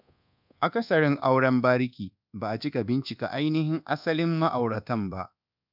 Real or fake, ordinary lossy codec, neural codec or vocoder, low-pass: fake; none; codec, 24 kHz, 1.2 kbps, DualCodec; 5.4 kHz